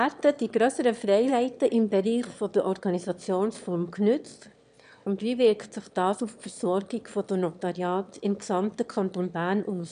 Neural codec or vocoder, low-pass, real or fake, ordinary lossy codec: autoencoder, 22.05 kHz, a latent of 192 numbers a frame, VITS, trained on one speaker; 9.9 kHz; fake; none